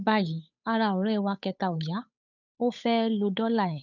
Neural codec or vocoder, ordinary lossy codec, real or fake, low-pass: codec, 16 kHz, 8 kbps, FunCodec, trained on Chinese and English, 25 frames a second; none; fake; 7.2 kHz